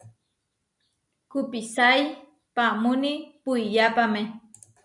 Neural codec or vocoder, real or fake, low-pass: none; real; 10.8 kHz